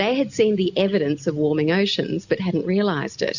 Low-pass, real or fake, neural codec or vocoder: 7.2 kHz; real; none